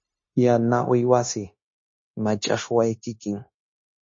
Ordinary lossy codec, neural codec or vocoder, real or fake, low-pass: MP3, 32 kbps; codec, 16 kHz, 0.9 kbps, LongCat-Audio-Codec; fake; 7.2 kHz